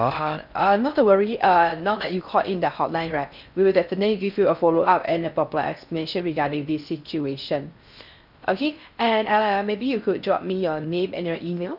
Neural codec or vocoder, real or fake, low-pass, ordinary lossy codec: codec, 16 kHz in and 24 kHz out, 0.6 kbps, FocalCodec, streaming, 4096 codes; fake; 5.4 kHz; none